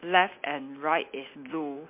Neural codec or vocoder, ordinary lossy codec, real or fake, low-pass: none; MP3, 32 kbps; real; 3.6 kHz